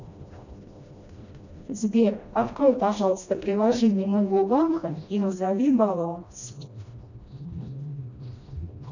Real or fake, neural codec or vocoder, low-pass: fake; codec, 16 kHz, 1 kbps, FreqCodec, smaller model; 7.2 kHz